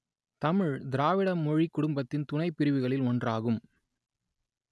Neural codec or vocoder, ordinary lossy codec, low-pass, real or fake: none; none; none; real